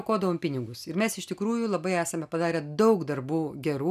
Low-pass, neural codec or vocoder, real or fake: 14.4 kHz; none; real